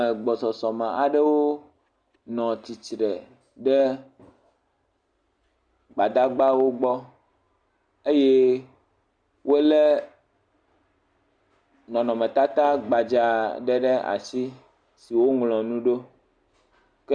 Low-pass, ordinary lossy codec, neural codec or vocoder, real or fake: 9.9 kHz; Opus, 64 kbps; none; real